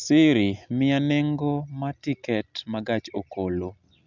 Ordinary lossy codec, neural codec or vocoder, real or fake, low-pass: none; none; real; 7.2 kHz